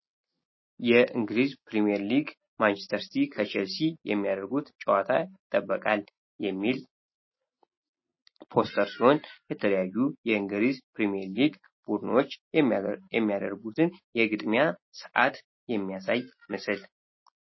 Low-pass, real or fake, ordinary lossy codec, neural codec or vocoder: 7.2 kHz; real; MP3, 24 kbps; none